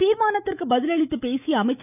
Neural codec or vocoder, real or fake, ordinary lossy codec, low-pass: autoencoder, 48 kHz, 128 numbers a frame, DAC-VAE, trained on Japanese speech; fake; none; 3.6 kHz